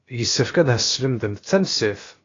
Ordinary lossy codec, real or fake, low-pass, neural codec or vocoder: AAC, 32 kbps; fake; 7.2 kHz; codec, 16 kHz, about 1 kbps, DyCAST, with the encoder's durations